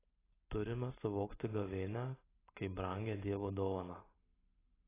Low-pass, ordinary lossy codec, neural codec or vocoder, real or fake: 3.6 kHz; AAC, 16 kbps; none; real